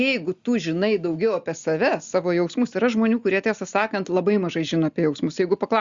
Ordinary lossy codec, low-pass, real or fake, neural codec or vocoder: Opus, 64 kbps; 7.2 kHz; real; none